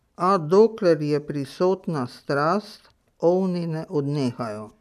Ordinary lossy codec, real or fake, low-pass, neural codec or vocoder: none; real; 14.4 kHz; none